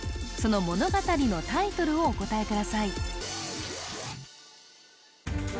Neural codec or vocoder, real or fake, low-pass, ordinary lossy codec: none; real; none; none